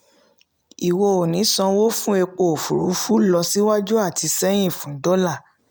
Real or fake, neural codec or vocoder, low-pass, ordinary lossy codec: real; none; none; none